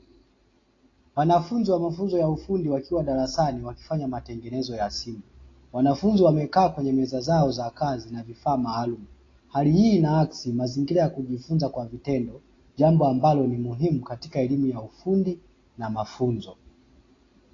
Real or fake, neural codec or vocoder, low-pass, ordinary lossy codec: real; none; 7.2 kHz; AAC, 32 kbps